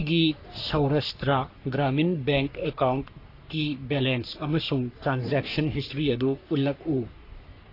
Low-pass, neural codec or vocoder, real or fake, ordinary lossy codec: 5.4 kHz; codec, 44.1 kHz, 3.4 kbps, Pupu-Codec; fake; AAC, 32 kbps